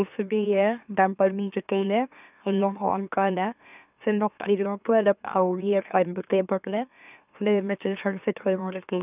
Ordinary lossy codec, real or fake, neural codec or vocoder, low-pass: none; fake; autoencoder, 44.1 kHz, a latent of 192 numbers a frame, MeloTTS; 3.6 kHz